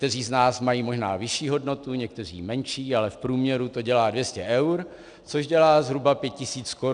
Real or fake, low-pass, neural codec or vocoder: real; 9.9 kHz; none